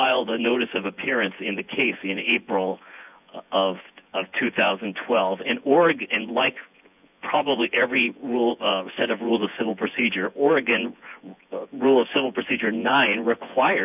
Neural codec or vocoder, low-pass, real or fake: vocoder, 24 kHz, 100 mel bands, Vocos; 3.6 kHz; fake